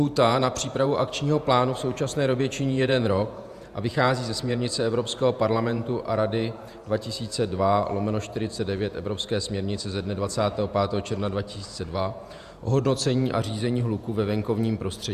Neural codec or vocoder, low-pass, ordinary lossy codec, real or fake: vocoder, 48 kHz, 128 mel bands, Vocos; 14.4 kHz; Opus, 64 kbps; fake